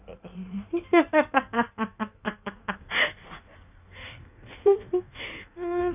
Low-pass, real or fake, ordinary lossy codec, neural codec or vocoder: 3.6 kHz; fake; none; codec, 16 kHz in and 24 kHz out, 1.1 kbps, FireRedTTS-2 codec